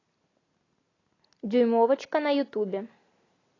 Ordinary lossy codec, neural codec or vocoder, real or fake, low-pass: AAC, 32 kbps; none; real; 7.2 kHz